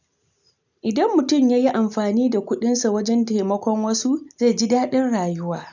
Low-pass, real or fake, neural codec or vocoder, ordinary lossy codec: 7.2 kHz; real; none; none